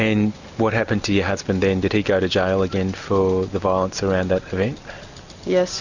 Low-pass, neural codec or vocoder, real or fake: 7.2 kHz; none; real